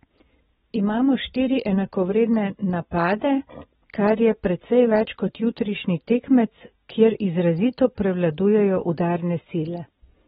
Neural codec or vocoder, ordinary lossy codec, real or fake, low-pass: none; AAC, 16 kbps; real; 19.8 kHz